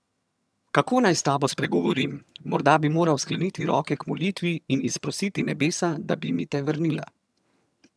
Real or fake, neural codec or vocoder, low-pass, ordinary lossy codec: fake; vocoder, 22.05 kHz, 80 mel bands, HiFi-GAN; none; none